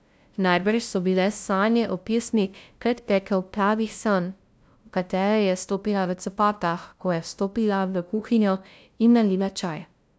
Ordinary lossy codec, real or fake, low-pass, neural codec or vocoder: none; fake; none; codec, 16 kHz, 0.5 kbps, FunCodec, trained on LibriTTS, 25 frames a second